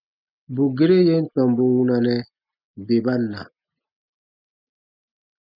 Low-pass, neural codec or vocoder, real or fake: 5.4 kHz; none; real